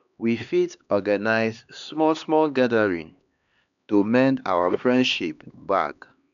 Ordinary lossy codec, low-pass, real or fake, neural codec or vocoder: none; 7.2 kHz; fake; codec, 16 kHz, 2 kbps, X-Codec, HuBERT features, trained on LibriSpeech